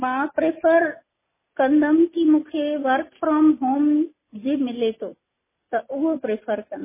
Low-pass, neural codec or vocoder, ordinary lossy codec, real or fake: 3.6 kHz; none; MP3, 16 kbps; real